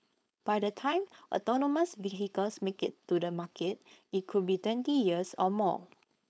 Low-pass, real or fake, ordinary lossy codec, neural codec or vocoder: none; fake; none; codec, 16 kHz, 4.8 kbps, FACodec